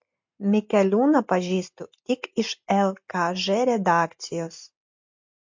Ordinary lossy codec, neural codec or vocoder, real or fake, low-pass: MP3, 48 kbps; none; real; 7.2 kHz